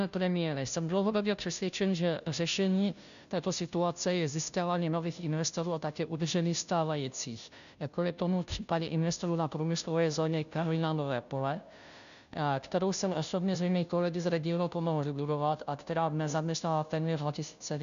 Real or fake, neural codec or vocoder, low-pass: fake; codec, 16 kHz, 0.5 kbps, FunCodec, trained on Chinese and English, 25 frames a second; 7.2 kHz